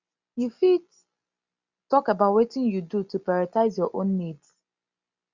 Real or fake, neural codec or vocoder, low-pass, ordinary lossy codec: real; none; 7.2 kHz; Opus, 64 kbps